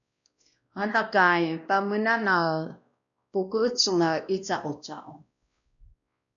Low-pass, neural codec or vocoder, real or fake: 7.2 kHz; codec, 16 kHz, 1 kbps, X-Codec, WavLM features, trained on Multilingual LibriSpeech; fake